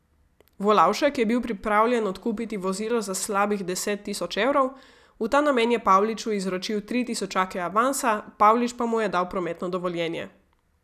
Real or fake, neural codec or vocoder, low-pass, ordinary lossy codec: real; none; 14.4 kHz; none